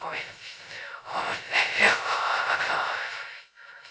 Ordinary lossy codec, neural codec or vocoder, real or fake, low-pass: none; codec, 16 kHz, 0.2 kbps, FocalCodec; fake; none